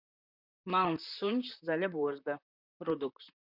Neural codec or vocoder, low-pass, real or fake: vocoder, 44.1 kHz, 128 mel bands, Pupu-Vocoder; 5.4 kHz; fake